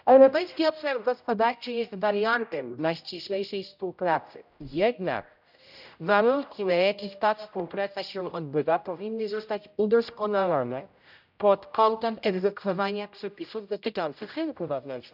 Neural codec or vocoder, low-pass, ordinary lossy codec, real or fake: codec, 16 kHz, 0.5 kbps, X-Codec, HuBERT features, trained on general audio; 5.4 kHz; none; fake